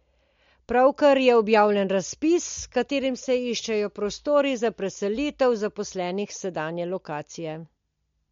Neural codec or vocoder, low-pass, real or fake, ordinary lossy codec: none; 7.2 kHz; real; MP3, 48 kbps